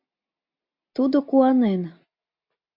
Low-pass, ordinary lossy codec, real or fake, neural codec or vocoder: 5.4 kHz; MP3, 48 kbps; real; none